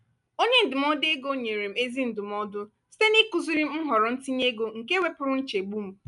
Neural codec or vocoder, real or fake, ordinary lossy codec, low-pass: none; real; none; 10.8 kHz